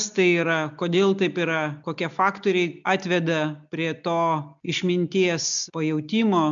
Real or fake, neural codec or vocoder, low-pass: real; none; 7.2 kHz